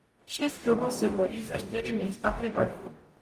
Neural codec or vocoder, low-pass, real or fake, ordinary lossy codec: codec, 44.1 kHz, 0.9 kbps, DAC; 14.4 kHz; fake; Opus, 32 kbps